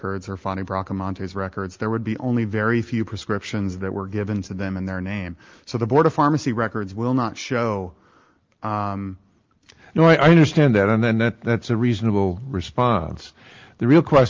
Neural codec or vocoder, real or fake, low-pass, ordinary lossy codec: none; real; 7.2 kHz; Opus, 32 kbps